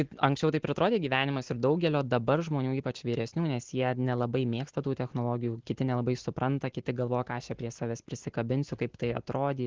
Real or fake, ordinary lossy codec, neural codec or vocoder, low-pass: real; Opus, 16 kbps; none; 7.2 kHz